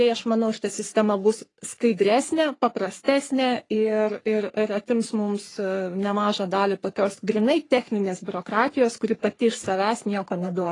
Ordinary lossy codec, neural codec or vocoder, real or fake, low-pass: AAC, 32 kbps; codec, 44.1 kHz, 3.4 kbps, Pupu-Codec; fake; 10.8 kHz